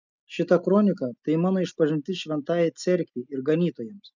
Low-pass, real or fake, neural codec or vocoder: 7.2 kHz; real; none